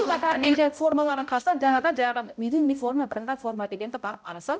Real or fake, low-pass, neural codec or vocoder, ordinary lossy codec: fake; none; codec, 16 kHz, 0.5 kbps, X-Codec, HuBERT features, trained on balanced general audio; none